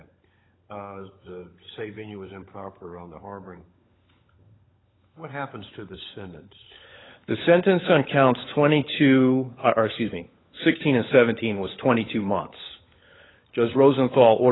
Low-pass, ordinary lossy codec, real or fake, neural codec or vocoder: 7.2 kHz; AAC, 16 kbps; fake; codec, 16 kHz, 16 kbps, FunCodec, trained on LibriTTS, 50 frames a second